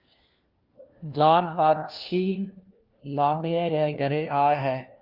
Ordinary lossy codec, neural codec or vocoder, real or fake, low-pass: Opus, 32 kbps; codec, 16 kHz, 1 kbps, FunCodec, trained on LibriTTS, 50 frames a second; fake; 5.4 kHz